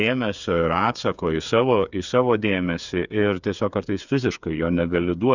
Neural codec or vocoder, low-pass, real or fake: codec, 16 kHz, 8 kbps, FreqCodec, smaller model; 7.2 kHz; fake